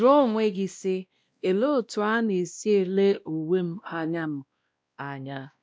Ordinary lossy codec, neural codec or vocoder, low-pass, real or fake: none; codec, 16 kHz, 1 kbps, X-Codec, WavLM features, trained on Multilingual LibriSpeech; none; fake